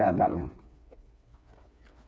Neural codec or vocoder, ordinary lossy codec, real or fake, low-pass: codec, 16 kHz, 4 kbps, FreqCodec, smaller model; none; fake; none